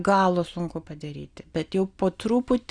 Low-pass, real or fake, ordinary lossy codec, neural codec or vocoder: 9.9 kHz; real; Opus, 64 kbps; none